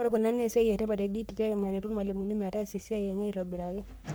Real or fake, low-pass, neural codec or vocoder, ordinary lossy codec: fake; none; codec, 44.1 kHz, 3.4 kbps, Pupu-Codec; none